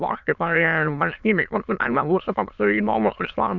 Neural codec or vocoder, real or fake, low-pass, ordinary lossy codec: autoencoder, 22.05 kHz, a latent of 192 numbers a frame, VITS, trained on many speakers; fake; 7.2 kHz; Opus, 64 kbps